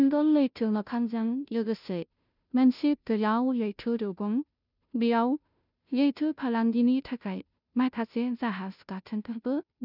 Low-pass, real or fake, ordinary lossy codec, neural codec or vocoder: 5.4 kHz; fake; none; codec, 16 kHz, 0.5 kbps, FunCodec, trained on Chinese and English, 25 frames a second